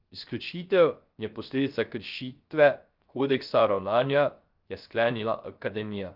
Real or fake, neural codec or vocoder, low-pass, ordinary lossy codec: fake; codec, 16 kHz, 0.3 kbps, FocalCodec; 5.4 kHz; Opus, 32 kbps